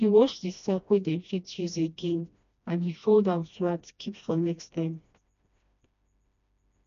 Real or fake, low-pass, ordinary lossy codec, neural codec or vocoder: fake; 7.2 kHz; none; codec, 16 kHz, 1 kbps, FreqCodec, smaller model